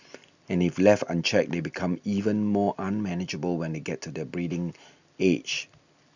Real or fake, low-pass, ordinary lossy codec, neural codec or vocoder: real; 7.2 kHz; none; none